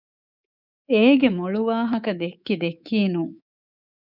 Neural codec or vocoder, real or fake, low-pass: codec, 24 kHz, 3.1 kbps, DualCodec; fake; 5.4 kHz